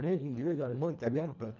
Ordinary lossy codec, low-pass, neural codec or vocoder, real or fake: none; 7.2 kHz; codec, 24 kHz, 1.5 kbps, HILCodec; fake